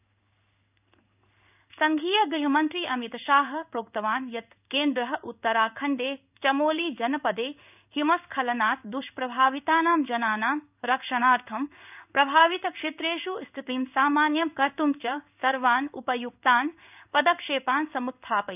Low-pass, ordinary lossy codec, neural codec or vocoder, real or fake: 3.6 kHz; none; codec, 16 kHz in and 24 kHz out, 1 kbps, XY-Tokenizer; fake